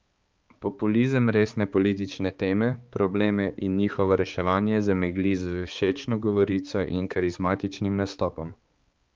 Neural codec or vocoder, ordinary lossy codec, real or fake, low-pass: codec, 16 kHz, 4 kbps, X-Codec, HuBERT features, trained on balanced general audio; Opus, 24 kbps; fake; 7.2 kHz